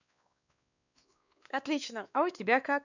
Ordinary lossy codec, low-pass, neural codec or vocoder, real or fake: none; 7.2 kHz; codec, 16 kHz, 2 kbps, X-Codec, WavLM features, trained on Multilingual LibriSpeech; fake